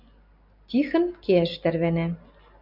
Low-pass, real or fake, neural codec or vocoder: 5.4 kHz; real; none